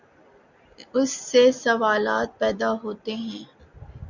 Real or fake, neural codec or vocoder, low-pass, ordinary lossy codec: real; none; 7.2 kHz; Opus, 64 kbps